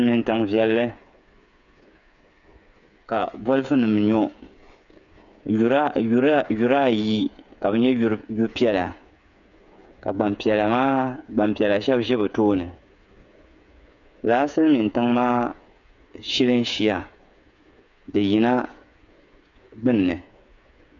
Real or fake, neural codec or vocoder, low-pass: fake; codec, 16 kHz, 8 kbps, FreqCodec, smaller model; 7.2 kHz